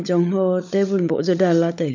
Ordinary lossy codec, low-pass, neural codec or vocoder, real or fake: none; 7.2 kHz; codec, 16 kHz, 16 kbps, FunCodec, trained on LibriTTS, 50 frames a second; fake